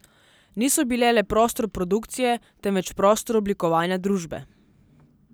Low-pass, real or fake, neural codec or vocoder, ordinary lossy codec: none; real; none; none